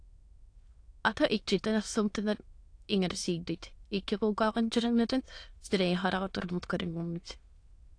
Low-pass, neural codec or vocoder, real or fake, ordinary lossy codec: 9.9 kHz; autoencoder, 22.05 kHz, a latent of 192 numbers a frame, VITS, trained on many speakers; fake; AAC, 48 kbps